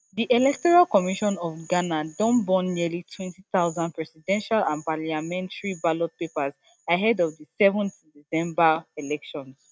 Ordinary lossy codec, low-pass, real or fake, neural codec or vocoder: none; none; real; none